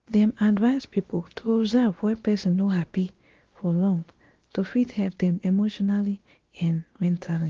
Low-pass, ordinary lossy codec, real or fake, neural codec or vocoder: 7.2 kHz; Opus, 16 kbps; fake; codec, 16 kHz, about 1 kbps, DyCAST, with the encoder's durations